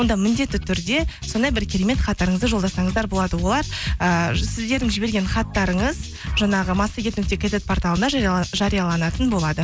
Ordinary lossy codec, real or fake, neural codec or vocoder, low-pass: none; real; none; none